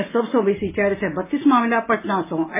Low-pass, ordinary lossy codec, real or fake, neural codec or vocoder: 3.6 kHz; MP3, 16 kbps; real; none